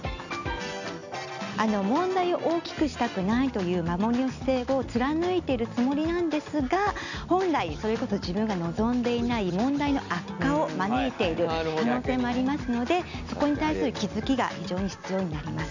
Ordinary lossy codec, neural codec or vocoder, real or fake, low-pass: none; none; real; 7.2 kHz